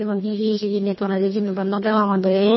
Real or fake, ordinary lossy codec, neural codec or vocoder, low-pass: fake; MP3, 24 kbps; codec, 24 kHz, 1.5 kbps, HILCodec; 7.2 kHz